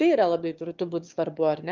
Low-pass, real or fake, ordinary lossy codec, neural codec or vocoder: 7.2 kHz; fake; Opus, 32 kbps; autoencoder, 22.05 kHz, a latent of 192 numbers a frame, VITS, trained on one speaker